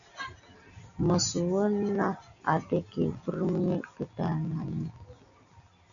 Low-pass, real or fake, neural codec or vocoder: 7.2 kHz; real; none